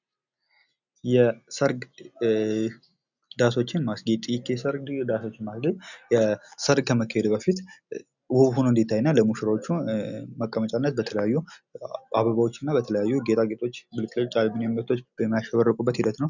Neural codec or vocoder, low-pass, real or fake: none; 7.2 kHz; real